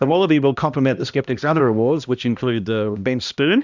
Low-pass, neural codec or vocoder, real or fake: 7.2 kHz; codec, 16 kHz, 1 kbps, X-Codec, HuBERT features, trained on balanced general audio; fake